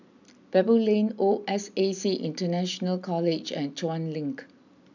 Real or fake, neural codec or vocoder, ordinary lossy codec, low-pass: real; none; none; 7.2 kHz